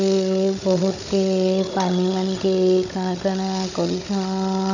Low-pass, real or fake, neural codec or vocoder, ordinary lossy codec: 7.2 kHz; fake; codec, 16 kHz, 16 kbps, FunCodec, trained on Chinese and English, 50 frames a second; none